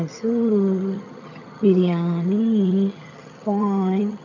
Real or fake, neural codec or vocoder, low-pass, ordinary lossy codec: fake; vocoder, 22.05 kHz, 80 mel bands, HiFi-GAN; 7.2 kHz; none